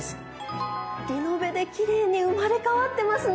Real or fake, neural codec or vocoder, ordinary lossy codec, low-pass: real; none; none; none